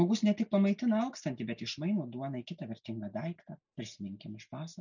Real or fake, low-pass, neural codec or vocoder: real; 7.2 kHz; none